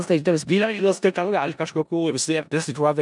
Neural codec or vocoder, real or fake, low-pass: codec, 16 kHz in and 24 kHz out, 0.4 kbps, LongCat-Audio-Codec, four codebook decoder; fake; 10.8 kHz